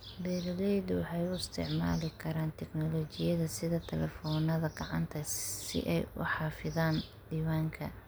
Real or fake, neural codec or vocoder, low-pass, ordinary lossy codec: real; none; none; none